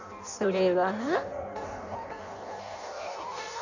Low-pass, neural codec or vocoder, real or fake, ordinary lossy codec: 7.2 kHz; codec, 16 kHz in and 24 kHz out, 0.6 kbps, FireRedTTS-2 codec; fake; none